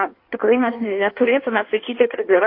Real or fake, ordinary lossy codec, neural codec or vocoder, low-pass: fake; AAC, 32 kbps; codec, 16 kHz in and 24 kHz out, 1.1 kbps, FireRedTTS-2 codec; 5.4 kHz